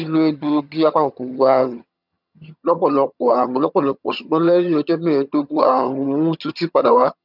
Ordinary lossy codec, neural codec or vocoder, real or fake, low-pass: none; vocoder, 22.05 kHz, 80 mel bands, HiFi-GAN; fake; 5.4 kHz